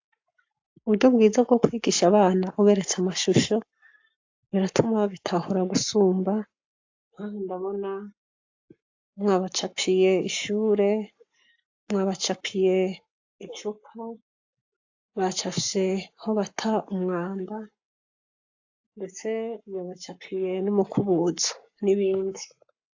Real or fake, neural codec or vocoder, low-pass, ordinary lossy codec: fake; codec, 44.1 kHz, 7.8 kbps, Pupu-Codec; 7.2 kHz; AAC, 48 kbps